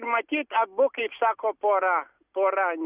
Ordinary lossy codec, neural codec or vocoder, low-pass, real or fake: Opus, 64 kbps; none; 3.6 kHz; real